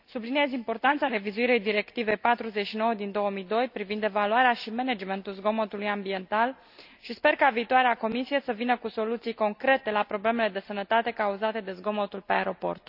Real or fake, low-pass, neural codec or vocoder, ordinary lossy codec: real; 5.4 kHz; none; none